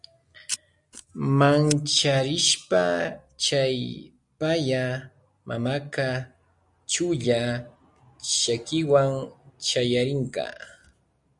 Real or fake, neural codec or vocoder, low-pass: real; none; 10.8 kHz